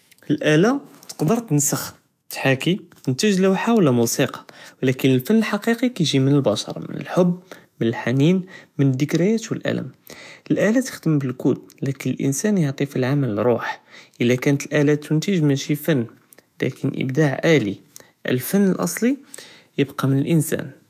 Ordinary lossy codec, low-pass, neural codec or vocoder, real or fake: none; 14.4 kHz; autoencoder, 48 kHz, 128 numbers a frame, DAC-VAE, trained on Japanese speech; fake